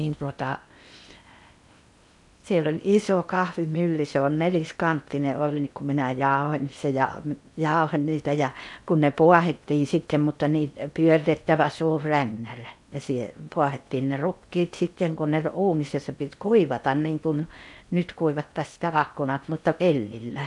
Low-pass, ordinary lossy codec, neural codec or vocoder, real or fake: 10.8 kHz; none; codec, 16 kHz in and 24 kHz out, 0.8 kbps, FocalCodec, streaming, 65536 codes; fake